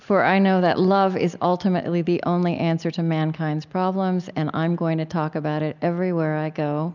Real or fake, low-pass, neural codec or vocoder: real; 7.2 kHz; none